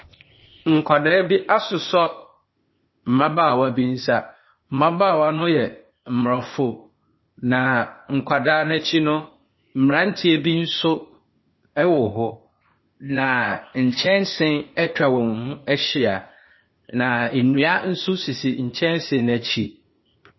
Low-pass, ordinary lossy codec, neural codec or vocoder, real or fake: 7.2 kHz; MP3, 24 kbps; codec, 16 kHz, 0.8 kbps, ZipCodec; fake